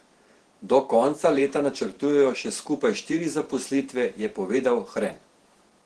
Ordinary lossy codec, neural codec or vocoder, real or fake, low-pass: Opus, 16 kbps; vocoder, 48 kHz, 128 mel bands, Vocos; fake; 10.8 kHz